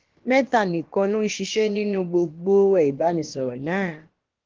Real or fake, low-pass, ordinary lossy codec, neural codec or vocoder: fake; 7.2 kHz; Opus, 16 kbps; codec, 16 kHz, about 1 kbps, DyCAST, with the encoder's durations